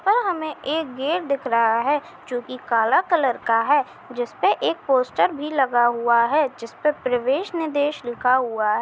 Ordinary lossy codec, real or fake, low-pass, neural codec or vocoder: none; real; none; none